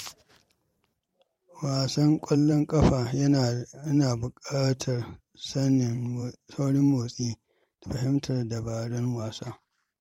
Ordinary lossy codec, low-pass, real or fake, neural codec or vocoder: MP3, 64 kbps; 19.8 kHz; fake; vocoder, 44.1 kHz, 128 mel bands every 512 samples, BigVGAN v2